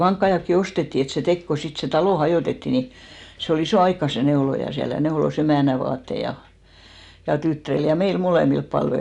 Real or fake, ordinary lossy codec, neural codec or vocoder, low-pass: real; none; none; 10.8 kHz